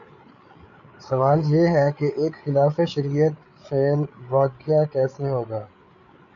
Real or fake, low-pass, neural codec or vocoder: fake; 7.2 kHz; codec, 16 kHz, 8 kbps, FreqCodec, larger model